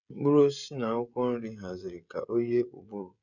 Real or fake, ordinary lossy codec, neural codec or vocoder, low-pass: fake; none; codec, 16 kHz, 16 kbps, FreqCodec, smaller model; 7.2 kHz